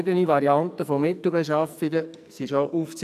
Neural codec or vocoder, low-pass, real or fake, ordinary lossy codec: codec, 44.1 kHz, 2.6 kbps, SNAC; 14.4 kHz; fake; AAC, 96 kbps